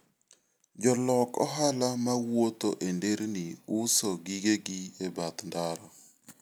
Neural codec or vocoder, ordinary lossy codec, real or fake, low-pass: none; none; real; none